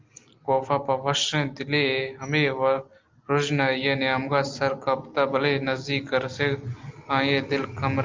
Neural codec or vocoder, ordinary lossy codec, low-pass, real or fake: none; Opus, 24 kbps; 7.2 kHz; real